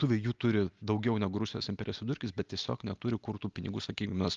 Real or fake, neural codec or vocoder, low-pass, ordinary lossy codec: real; none; 7.2 kHz; Opus, 32 kbps